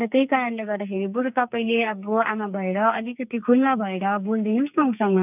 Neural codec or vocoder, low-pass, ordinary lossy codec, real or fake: codec, 44.1 kHz, 2.6 kbps, SNAC; 3.6 kHz; none; fake